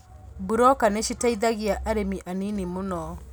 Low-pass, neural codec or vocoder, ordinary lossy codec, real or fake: none; none; none; real